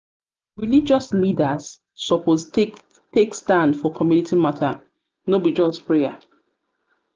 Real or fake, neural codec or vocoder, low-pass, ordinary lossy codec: real; none; 7.2 kHz; Opus, 24 kbps